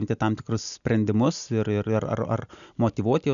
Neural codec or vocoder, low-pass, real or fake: none; 7.2 kHz; real